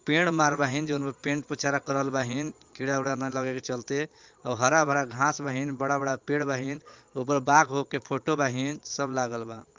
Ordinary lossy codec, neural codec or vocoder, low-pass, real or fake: Opus, 32 kbps; vocoder, 22.05 kHz, 80 mel bands, Vocos; 7.2 kHz; fake